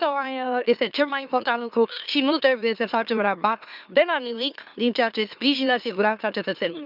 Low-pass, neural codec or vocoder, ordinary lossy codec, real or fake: 5.4 kHz; autoencoder, 44.1 kHz, a latent of 192 numbers a frame, MeloTTS; none; fake